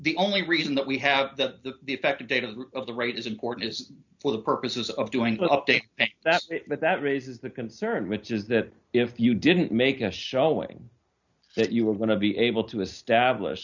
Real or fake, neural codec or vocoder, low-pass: real; none; 7.2 kHz